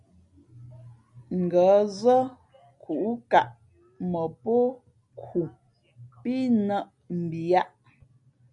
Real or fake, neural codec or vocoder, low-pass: real; none; 10.8 kHz